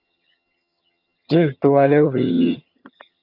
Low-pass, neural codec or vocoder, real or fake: 5.4 kHz; vocoder, 22.05 kHz, 80 mel bands, HiFi-GAN; fake